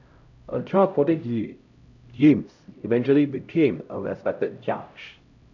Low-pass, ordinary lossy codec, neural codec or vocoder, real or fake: 7.2 kHz; none; codec, 16 kHz, 0.5 kbps, X-Codec, HuBERT features, trained on LibriSpeech; fake